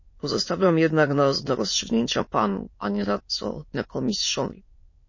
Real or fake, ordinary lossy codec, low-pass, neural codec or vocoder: fake; MP3, 32 kbps; 7.2 kHz; autoencoder, 22.05 kHz, a latent of 192 numbers a frame, VITS, trained on many speakers